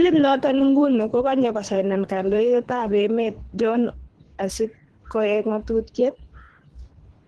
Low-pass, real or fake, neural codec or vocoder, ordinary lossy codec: 10.8 kHz; fake; codec, 24 kHz, 3 kbps, HILCodec; Opus, 16 kbps